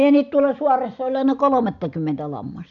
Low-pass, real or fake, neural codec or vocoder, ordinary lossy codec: 7.2 kHz; real; none; MP3, 96 kbps